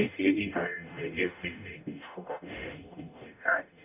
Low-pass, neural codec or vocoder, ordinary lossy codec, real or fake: 3.6 kHz; codec, 44.1 kHz, 0.9 kbps, DAC; none; fake